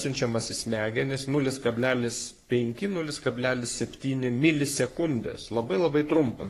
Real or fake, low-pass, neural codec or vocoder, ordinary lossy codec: fake; 14.4 kHz; codec, 44.1 kHz, 3.4 kbps, Pupu-Codec; AAC, 48 kbps